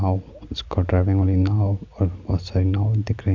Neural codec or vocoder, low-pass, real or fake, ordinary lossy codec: none; 7.2 kHz; real; MP3, 64 kbps